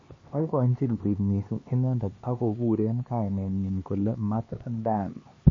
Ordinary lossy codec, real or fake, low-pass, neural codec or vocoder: MP3, 32 kbps; fake; 7.2 kHz; codec, 16 kHz, 2 kbps, X-Codec, HuBERT features, trained on LibriSpeech